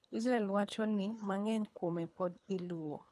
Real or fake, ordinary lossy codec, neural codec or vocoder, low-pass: fake; none; codec, 24 kHz, 3 kbps, HILCodec; none